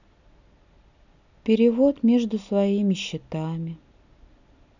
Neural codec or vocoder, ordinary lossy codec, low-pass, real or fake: none; none; 7.2 kHz; real